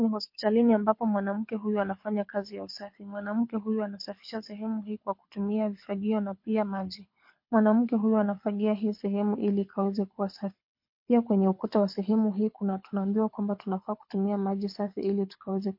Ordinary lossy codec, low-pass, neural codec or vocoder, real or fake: MP3, 32 kbps; 5.4 kHz; codec, 24 kHz, 6 kbps, HILCodec; fake